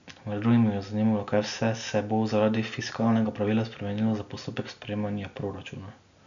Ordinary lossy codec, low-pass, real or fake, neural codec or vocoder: none; 7.2 kHz; real; none